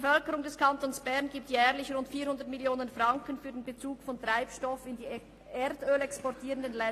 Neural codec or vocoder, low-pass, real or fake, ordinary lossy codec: none; 14.4 kHz; real; AAC, 48 kbps